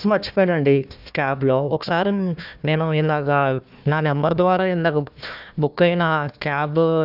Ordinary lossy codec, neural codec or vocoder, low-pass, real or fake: none; codec, 16 kHz, 1 kbps, FunCodec, trained on Chinese and English, 50 frames a second; 5.4 kHz; fake